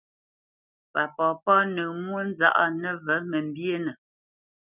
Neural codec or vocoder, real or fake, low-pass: none; real; 3.6 kHz